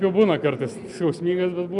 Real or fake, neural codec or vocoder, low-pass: real; none; 10.8 kHz